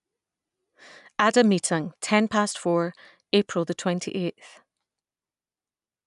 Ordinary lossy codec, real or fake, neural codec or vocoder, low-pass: none; real; none; 10.8 kHz